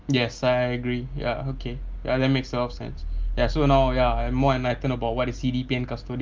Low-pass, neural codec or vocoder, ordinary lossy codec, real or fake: 7.2 kHz; none; Opus, 32 kbps; real